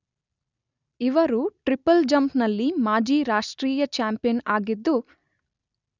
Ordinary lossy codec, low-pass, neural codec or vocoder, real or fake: none; 7.2 kHz; none; real